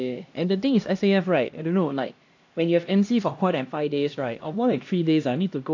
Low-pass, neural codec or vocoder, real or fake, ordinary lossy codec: 7.2 kHz; codec, 16 kHz, 1 kbps, X-Codec, HuBERT features, trained on LibriSpeech; fake; AAC, 48 kbps